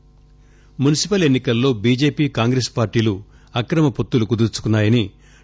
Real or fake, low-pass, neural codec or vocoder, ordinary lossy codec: real; none; none; none